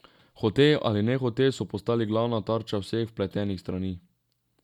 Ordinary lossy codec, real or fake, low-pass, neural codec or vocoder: none; real; 19.8 kHz; none